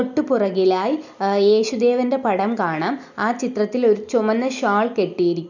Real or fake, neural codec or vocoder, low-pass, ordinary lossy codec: real; none; 7.2 kHz; none